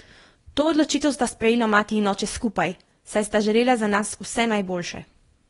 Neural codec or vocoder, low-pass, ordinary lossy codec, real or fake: codec, 24 kHz, 0.9 kbps, WavTokenizer, small release; 10.8 kHz; AAC, 32 kbps; fake